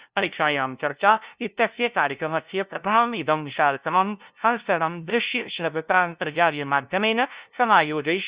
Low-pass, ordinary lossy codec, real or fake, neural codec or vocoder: 3.6 kHz; Opus, 64 kbps; fake; codec, 16 kHz, 0.5 kbps, FunCodec, trained on LibriTTS, 25 frames a second